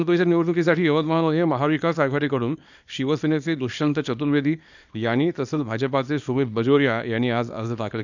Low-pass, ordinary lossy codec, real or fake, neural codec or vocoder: 7.2 kHz; none; fake; codec, 24 kHz, 0.9 kbps, WavTokenizer, small release